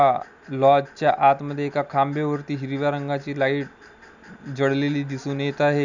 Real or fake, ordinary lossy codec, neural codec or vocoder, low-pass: real; none; none; 7.2 kHz